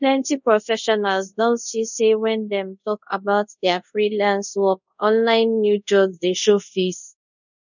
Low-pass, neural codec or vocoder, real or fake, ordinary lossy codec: 7.2 kHz; codec, 24 kHz, 0.5 kbps, DualCodec; fake; none